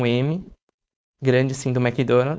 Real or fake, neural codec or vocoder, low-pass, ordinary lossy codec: fake; codec, 16 kHz, 4.8 kbps, FACodec; none; none